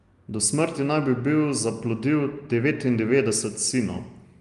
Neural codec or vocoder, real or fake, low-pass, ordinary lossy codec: none; real; 10.8 kHz; Opus, 32 kbps